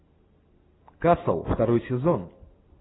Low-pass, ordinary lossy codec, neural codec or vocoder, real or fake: 7.2 kHz; AAC, 16 kbps; none; real